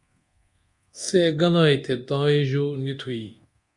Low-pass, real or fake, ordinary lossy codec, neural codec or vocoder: 10.8 kHz; fake; Opus, 64 kbps; codec, 24 kHz, 0.9 kbps, DualCodec